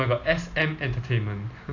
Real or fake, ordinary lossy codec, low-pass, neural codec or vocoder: real; AAC, 48 kbps; 7.2 kHz; none